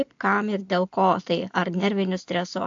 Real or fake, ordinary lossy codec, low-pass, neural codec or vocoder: real; AAC, 64 kbps; 7.2 kHz; none